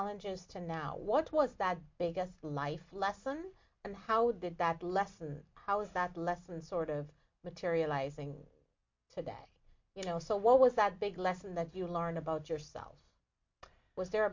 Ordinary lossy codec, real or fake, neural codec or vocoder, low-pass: MP3, 48 kbps; real; none; 7.2 kHz